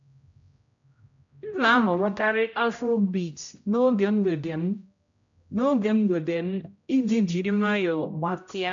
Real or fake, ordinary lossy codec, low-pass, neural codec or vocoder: fake; AAC, 64 kbps; 7.2 kHz; codec, 16 kHz, 0.5 kbps, X-Codec, HuBERT features, trained on general audio